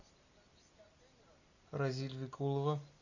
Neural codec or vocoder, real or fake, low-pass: none; real; 7.2 kHz